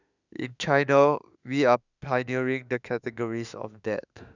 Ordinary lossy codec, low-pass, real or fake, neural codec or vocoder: none; 7.2 kHz; fake; autoencoder, 48 kHz, 32 numbers a frame, DAC-VAE, trained on Japanese speech